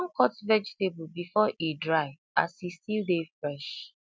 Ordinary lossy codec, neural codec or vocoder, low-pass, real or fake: none; none; none; real